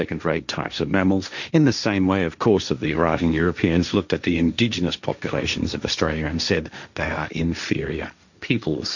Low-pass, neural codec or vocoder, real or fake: 7.2 kHz; codec, 16 kHz, 1.1 kbps, Voila-Tokenizer; fake